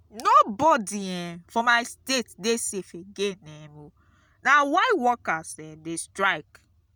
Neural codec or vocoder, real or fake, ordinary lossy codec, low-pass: none; real; none; none